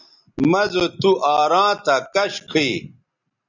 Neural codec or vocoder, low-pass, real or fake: none; 7.2 kHz; real